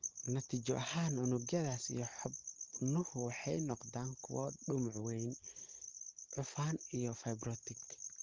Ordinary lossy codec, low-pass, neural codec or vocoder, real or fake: Opus, 16 kbps; 7.2 kHz; none; real